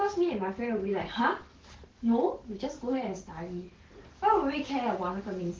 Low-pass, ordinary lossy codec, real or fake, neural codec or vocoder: 7.2 kHz; Opus, 16 kbps; fake; codec, 44.1 kHz, 7.8 kbps, DAC